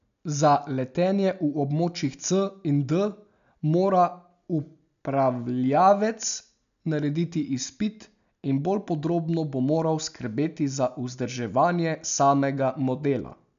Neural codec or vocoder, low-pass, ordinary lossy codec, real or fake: none; 7.2 kHz; none; real